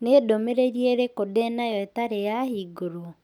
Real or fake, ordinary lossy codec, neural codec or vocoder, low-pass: real; none; none; 19.8 kHz